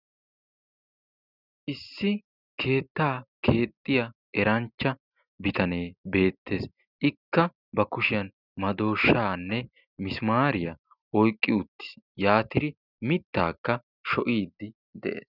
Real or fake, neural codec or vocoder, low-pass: real; none; 5.4 kHz